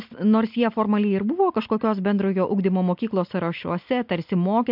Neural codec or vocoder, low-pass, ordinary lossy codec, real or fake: none; 5.4 kHz; MP3, 48 kbps; real